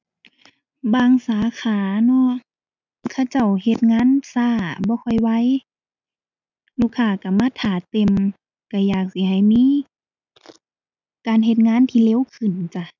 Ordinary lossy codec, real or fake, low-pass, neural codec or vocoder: none; real; 7.2 kHz; none